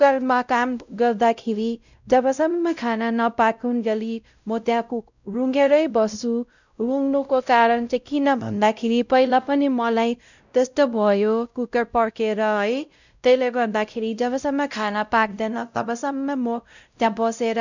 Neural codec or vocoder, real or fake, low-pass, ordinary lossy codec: codec, 16 kHz, 0.5 kbps, X-Codec, WavLM features, trained on Multilingual LibriSpeech; fake; 7.2 kHz; none